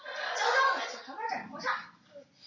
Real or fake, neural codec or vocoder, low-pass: real; none; 7.2 kHz